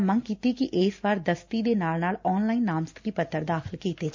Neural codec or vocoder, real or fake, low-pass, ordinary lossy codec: none; real; 7.2 kHz; MP3, 64 kbps